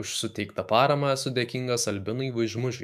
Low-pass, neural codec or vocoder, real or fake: 14.4 kHz; autoencoder, 48 kHz, 128 numbers a frame, DAC-VAE, trained on Japanese speech; fake